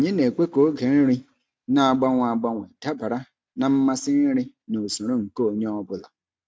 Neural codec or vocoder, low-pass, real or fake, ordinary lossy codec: none; none; real; none